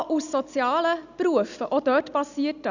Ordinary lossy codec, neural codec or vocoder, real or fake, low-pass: none; none; real; 7.2 kHz